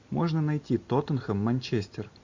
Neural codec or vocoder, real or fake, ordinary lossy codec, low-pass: none; real; AAC, 48 kbps; 7.2 kHz